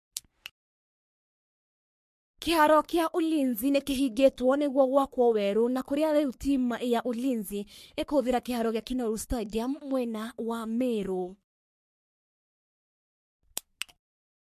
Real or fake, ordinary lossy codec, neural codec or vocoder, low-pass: fake; MP3, 64 kbps; codec, 44.1 kHz, 3.4 kbps, Pupu-Codec; 14.4 kHz